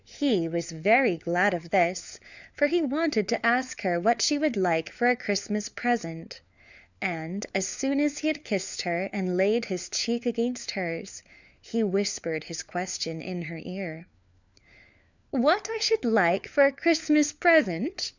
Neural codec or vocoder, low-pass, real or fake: codec, 16 kHz, 4 kbps, FunCodec, trained on LibriTTS, 50 frames a second; 7.2 kHz; fake